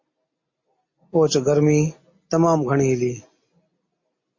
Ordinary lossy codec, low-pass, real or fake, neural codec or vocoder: MP3, 32 kbps; 7.2 kHz; real; none